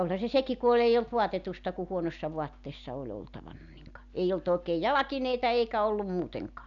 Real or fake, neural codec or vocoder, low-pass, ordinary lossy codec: real; none; 7.2 kHz; none